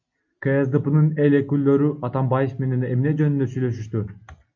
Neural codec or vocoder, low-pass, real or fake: none; 7.2 kHz; real